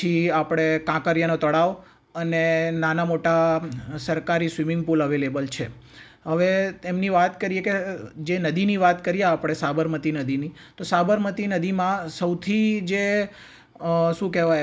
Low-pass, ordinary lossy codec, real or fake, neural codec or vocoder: none; none; real; none